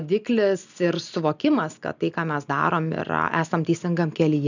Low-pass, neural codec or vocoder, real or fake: 7.2 kHz; none; real